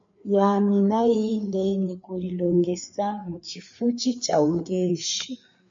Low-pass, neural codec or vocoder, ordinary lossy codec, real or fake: 7.2 kHz; codec, 16 kHz, 4 kbps, FreqCodec, larger model; MP3, 48 kbps; fake